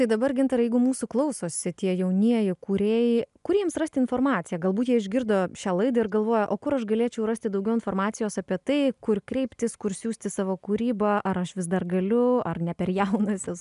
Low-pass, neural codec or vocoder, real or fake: 10.8 kHz; none; real